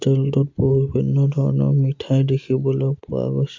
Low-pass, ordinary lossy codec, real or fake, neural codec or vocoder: 7.2 kHz; MP3, 48 kbps; real; none